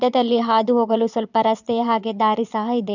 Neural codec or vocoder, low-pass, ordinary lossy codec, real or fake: vocoder, 22.05 kHz, 80 mel bands, Vocos; 7.2 kHz; none; fake